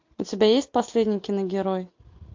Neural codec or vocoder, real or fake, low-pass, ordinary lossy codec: none; real; 7.2 kHz; MP3, 48 kbps